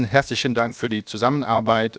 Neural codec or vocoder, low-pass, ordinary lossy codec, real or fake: codec, 16 kHz, 0.7 kbps, FocalCodec; none; none; fake